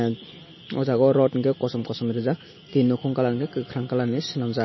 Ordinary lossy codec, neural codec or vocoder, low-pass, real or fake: MP3, 24 kbps; none; 7.2 kHz; real